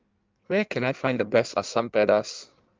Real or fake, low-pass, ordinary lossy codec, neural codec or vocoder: fake; 7.2 kHz; Opus, 32 kbps; codec, 16 kHz in and 24 kHz out, 1.1 kbps, FireRedTTS-2 codec